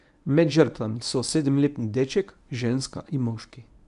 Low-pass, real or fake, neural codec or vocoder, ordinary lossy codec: 10.8 kHz; fake; codec, 24 kHz, 0.9 kbps, WavTokenizer, medium speech release version 1; none